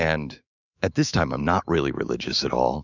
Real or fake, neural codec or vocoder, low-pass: fake; autoencoder, 48 kHz, 128 numbers a frame, DAC-VAE, trained on Japanese speech; 7.2 kHz